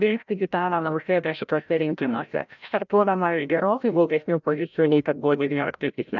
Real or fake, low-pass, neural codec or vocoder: fake; 7.2 kHz; codec, 16 kHz, 0.5 kbps, FreqCodec, larger model